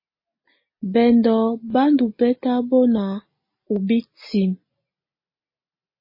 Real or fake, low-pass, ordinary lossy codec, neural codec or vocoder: real; 5.4 kHz; MP3, 24 kbps; none